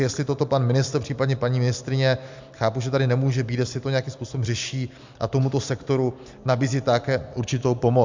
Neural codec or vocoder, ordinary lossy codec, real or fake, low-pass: none; MP3, 64 kbps; real; 7.2 kHz